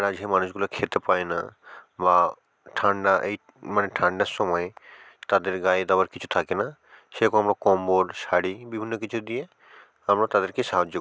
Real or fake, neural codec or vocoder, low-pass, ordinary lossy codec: real; none; none; none